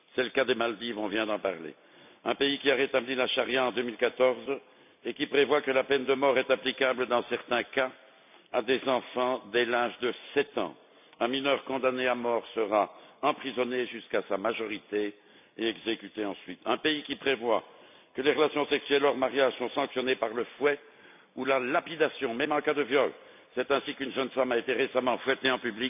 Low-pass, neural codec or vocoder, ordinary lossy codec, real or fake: 3.6 kHz; none; none; real